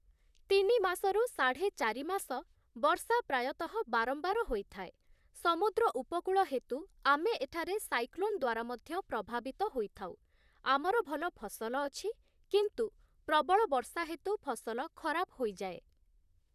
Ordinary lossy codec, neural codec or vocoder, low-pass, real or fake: none; vocoder, 44.1 kHz, 128 mel bands, Pupu-Vocoder; 14.4 kHz; fake